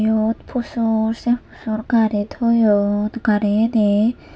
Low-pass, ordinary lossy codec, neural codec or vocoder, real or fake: none; none; none; real